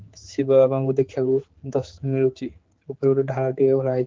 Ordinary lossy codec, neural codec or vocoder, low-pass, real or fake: Opus, 16 kbps; codec, 16 kHz, 4 kbps, X-Codec, HuBERT features, trained on general audio; 7.2 kHz; fake